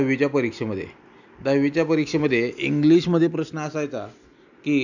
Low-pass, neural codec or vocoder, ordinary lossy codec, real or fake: 7.2 kHz; none; none; real